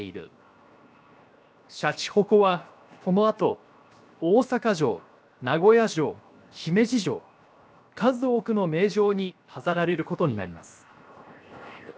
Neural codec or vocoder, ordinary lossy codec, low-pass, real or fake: codec, 16 kHz, 0.7 kbps, FocalCodec; none; none; fake